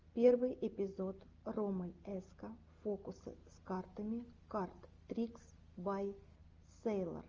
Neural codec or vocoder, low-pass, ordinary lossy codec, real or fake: none; 7.2 kHz; Opus, 32 kbps; real